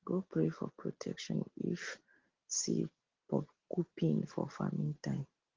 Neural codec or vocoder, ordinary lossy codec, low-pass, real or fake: none; Opus, 16 kbps; 7.2 kHz; real